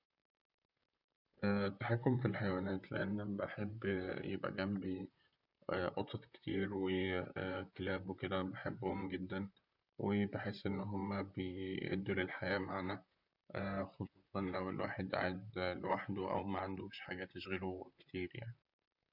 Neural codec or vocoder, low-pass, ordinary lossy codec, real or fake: vocoder, 44.1 kHz, 128 mel bands, Pupu-Vocoder; 5.4 kHz; none; fake